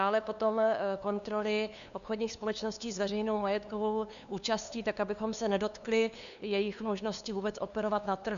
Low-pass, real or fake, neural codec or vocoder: 7.2 kHz; fake; codec, 16 kHz, 2 kbps, FunCodec, trained on LibriTTS, 25 frames a second